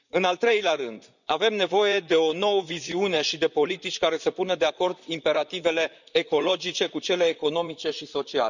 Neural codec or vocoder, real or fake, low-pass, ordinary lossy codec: vocoder, 44.1 kHz, 128 mel bands, Pupu-Vocoder; fake; 7.2 kHz; none